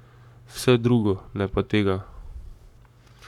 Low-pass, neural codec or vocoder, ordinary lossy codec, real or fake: 19.8 kHz; codec, 44.1 kHz, 7.8 kbps, Pupu-Codec; none; fake